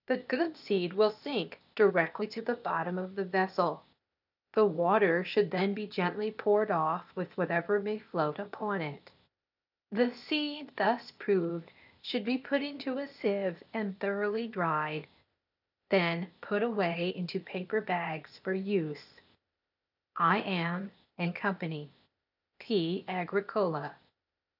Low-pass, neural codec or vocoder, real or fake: 5.4 kHz; codec, 16 kHz, 0.8 kbps, ZipCodec; fake